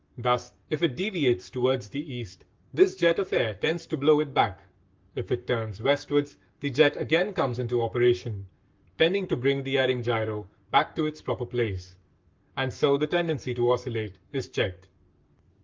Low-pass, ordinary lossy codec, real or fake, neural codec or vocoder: 7.2 kHz; Opus, 24 kbps; fake; codec, 44.1 kHz, 7.8 kbps, Pupu-Codec